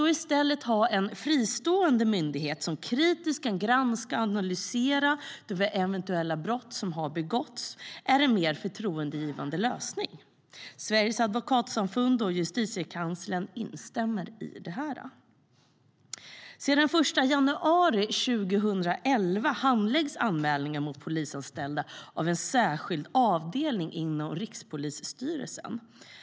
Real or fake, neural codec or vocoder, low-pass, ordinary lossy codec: real; none; none; none